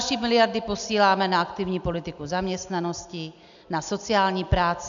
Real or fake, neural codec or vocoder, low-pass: real; none; 7.2 kHz